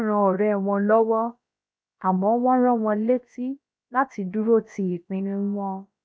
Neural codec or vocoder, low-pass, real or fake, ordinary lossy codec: codec, 16 kHz, about 1 kbps, DyCAST, with the encoder's durations; none; fake; none